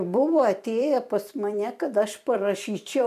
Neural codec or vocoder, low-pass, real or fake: vocoder, 44.1 kHz, 128 mel bands every 256 samples, BigVGAN v2; 14.4 kHz; fake